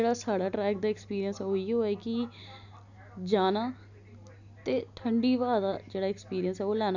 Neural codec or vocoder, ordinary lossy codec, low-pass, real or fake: none; none; 7.2 kHz; real